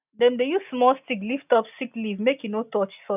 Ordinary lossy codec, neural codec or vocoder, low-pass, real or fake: none; none; 3.6 kHz; real